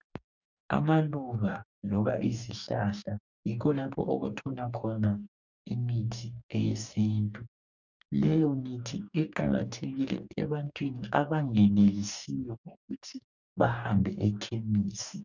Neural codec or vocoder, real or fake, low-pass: codec, 44.1 kHz, 2.6 kbps, DAC; fake; 7.2 kHz